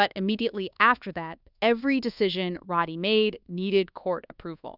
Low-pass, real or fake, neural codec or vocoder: 5.4 kHz; fake; codec, 24 kHz, 1.2 kbps, DualCodec